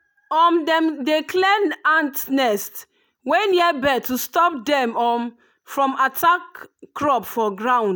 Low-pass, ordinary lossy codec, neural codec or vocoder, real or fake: none; none; none; real